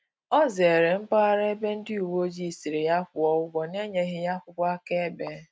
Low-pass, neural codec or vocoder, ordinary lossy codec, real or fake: none; none; none; real